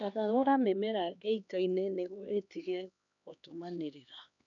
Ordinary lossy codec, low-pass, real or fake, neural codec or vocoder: none; 7.2 kHz; fake; codec, 16 kHz, 2 kbps, X-Codec, HuBERT features, trained on LibriSpeech